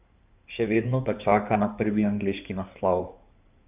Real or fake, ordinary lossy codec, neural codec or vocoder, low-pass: fake; none; codec, 16 kHz in and 24 kHz out, 2.2 kbps, FireRedTTS-2 codec; 3.6 kHz